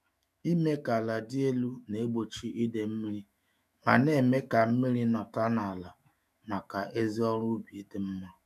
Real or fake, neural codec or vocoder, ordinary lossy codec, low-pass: fake; autoencoder, 48 kHz, 128 numbers a frame, DAC-VAE, trained on Japanese speech; none; 14.4 kHz